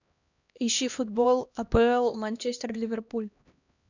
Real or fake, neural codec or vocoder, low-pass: fake; codec, 16 kHz, 1 kbps, X-Codec, HuBERT features, trained on LibriSpeech; 7.2 kHz